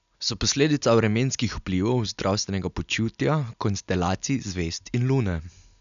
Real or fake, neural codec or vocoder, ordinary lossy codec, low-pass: real; none; none; 7.2 kHz